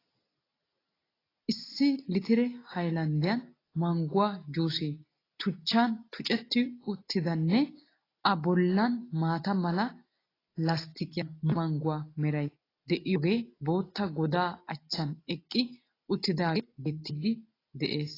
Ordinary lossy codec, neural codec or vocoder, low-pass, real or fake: AAC, 24 kbps; none; 5.4 kHz; real